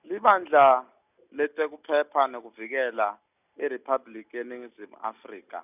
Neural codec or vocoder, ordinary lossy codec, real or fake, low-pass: none; none; real; 3.6 kHz